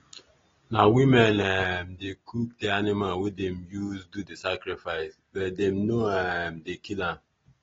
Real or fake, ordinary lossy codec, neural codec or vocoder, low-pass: real; AAC, 24 kbps; none; 7.2 kHz